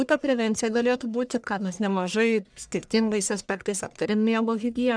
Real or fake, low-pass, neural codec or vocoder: fake; 9.9 kHz; codec, 44.1 kHz, 1.7 kbps, Pupu-Codec